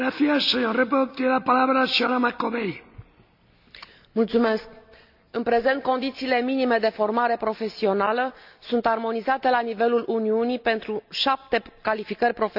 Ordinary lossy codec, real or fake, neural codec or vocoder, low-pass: none; real; none; 5.4 kHz